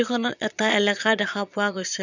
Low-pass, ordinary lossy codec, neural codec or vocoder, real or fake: 7.2 kHz; none; vocoder, 22.05 kHz, 80 mel bands, Vocos; fake